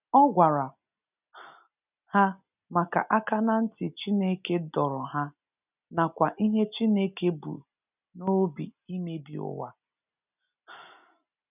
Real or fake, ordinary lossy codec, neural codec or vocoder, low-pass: real; none; none; 3.6 kHz